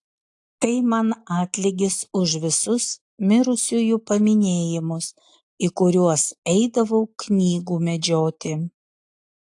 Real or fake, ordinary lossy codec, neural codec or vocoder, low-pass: real; AAC, 64 kbps; none; 10.8 kHz